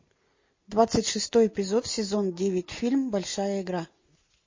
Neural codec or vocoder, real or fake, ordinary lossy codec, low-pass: none; real; MP3, 32 kbps; 7.2 kHz